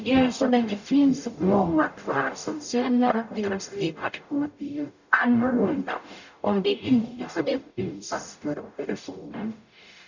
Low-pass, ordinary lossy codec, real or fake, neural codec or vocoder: 7.2 kHz; none; fake; codec, 44.1 kHz, 0.9 kbps, DAC